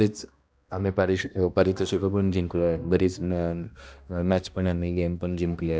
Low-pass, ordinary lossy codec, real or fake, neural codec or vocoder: none; none; fake; codec, 16 kHz, 1 kbps, X-Codec, HuBERT features, trained on balanced general audio